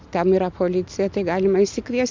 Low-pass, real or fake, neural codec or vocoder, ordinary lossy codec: 7.2 kHz; real; none; MP3, 64 kbps